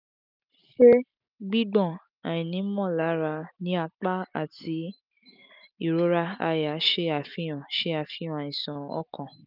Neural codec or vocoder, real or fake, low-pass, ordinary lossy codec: none; real; 5.4 kHz; none